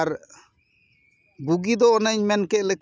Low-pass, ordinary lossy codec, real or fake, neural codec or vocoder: none; none; real; none